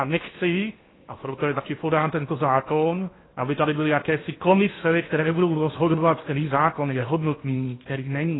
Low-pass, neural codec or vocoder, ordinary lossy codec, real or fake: 7.2 kHz; codec, 16 kHz in and 24 kHz out, 0.8 kbps, FocalCodec, streaming, 65536 codes; AAC, 16 kbps; fake